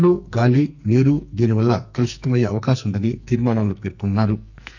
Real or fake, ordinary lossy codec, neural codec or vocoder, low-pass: fake; none; codec, 44.1 kHz, 2.6 kbps, SNAC; 7.2 kHz